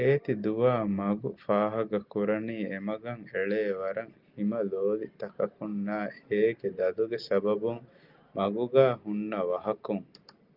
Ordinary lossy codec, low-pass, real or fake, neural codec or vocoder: Opus, 24 kbps; 5.4 kHz; real; none